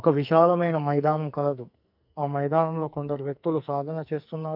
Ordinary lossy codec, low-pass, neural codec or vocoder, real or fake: none; 5.4 kHz; codec, 44.1 kHz, 2.6 kbps, SNAC; fake